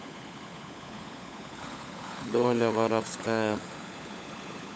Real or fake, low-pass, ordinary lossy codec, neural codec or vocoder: fake; none; none; codec, 16 kHz, 16 kbps, FunCodec, trained on LibriTTS, 50 frames a second